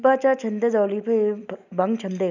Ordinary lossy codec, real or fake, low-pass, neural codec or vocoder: none; real; 7.2 kHz; none